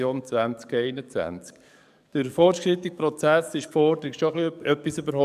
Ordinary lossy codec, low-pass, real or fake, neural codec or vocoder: none; 14.4 kHz; fake; codec, 44.1 kHz, 7.8 kbps, DAC